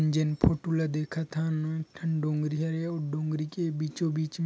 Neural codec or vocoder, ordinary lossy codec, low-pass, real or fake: none; none; none; real